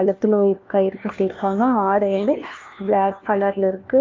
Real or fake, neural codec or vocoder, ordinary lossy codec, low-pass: fake; codec, 16 kHz, 1 kbps, FunCodec, trained on LibriTTS, 50 frames a second; Opus, 24 kbps; 7.2 kHz